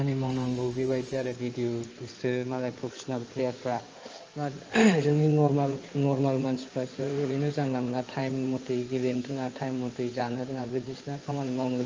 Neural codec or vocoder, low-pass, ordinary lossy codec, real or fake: codec, 16 kHz in and 24 kHz out, 2.2 kbps, FireRedTTS-2 codec; 7.2 kHz; Opus, 24 kbps; fake